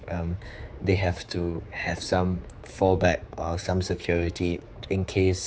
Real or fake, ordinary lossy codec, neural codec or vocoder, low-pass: fake; none; codec, 16 kHz, 4 kbps, X-Codec, HuBERT features, trained on general audio; none